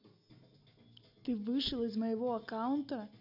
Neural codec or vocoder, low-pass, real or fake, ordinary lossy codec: none; 5.4 kHz; real; none